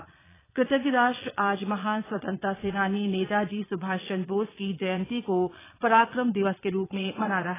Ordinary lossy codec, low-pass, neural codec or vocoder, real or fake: AAC, 16 kbps; 3.6 kHz; vocoder, 22.05 kHz, 80 mel bands, Vocos; fake